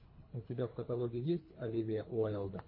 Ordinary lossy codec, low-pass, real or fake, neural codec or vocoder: MP3, 24 kbps; 5.4 kHz; fake; codec, 24 kHz, 3 kbps, HILCodec